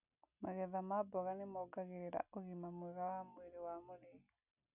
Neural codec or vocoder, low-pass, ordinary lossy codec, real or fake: none; 3.6 kHz; none; real